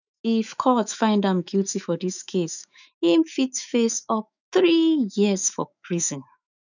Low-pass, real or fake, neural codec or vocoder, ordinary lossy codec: 7.2 kHz; fake; autoencoder, 48 kHz, 128 numbers a frame, DAC-VAE, trained on Japanese speech; none